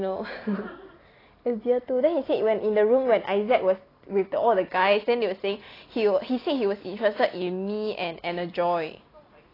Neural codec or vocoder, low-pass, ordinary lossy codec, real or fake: none; 5.4 kHz; AAC, 24 kbps; real